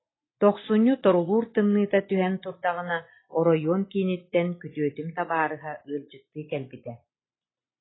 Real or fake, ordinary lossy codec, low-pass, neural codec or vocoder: real; AAC, 16 kbps; 7.2 kHz; none